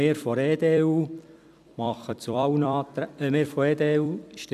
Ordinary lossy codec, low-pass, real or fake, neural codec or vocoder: none; 14.4 kHz; fake; vocoder, 44.1 kHz, 128 mel bands every 256 samples, BigVGAN v2